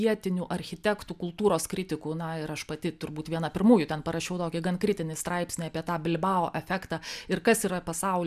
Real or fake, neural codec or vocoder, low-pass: real; none; 14.4 kHz